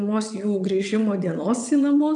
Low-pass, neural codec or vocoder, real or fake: 9.9 kHz; vocoder, 22.05 kHz, 80 mel bands, WaveNeXt; fake